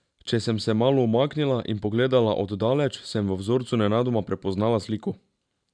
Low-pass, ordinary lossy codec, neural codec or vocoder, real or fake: 9.9 kHz; none; vocoder, 44.1 kHz, 128 mel bands every 512 samples, BigVGAN v2; fake